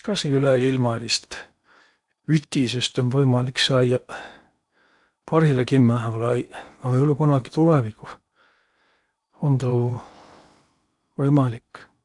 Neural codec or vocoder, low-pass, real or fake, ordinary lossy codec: codec, 16 kHz in and 24 kHz out, 0.8 kbps, FocalCodec, streaming, 65536 codes; 10.8 kHz; fake; none